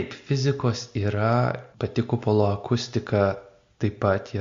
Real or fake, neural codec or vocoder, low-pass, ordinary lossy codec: real; none; 7.2 kHz; MP3, 48 kbps